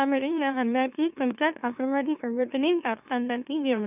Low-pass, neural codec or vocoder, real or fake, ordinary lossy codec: 3.6 kHz; autoencoder, 44.1 kHz, a latent of 192 numbers a frame, MeloTTS; fake; none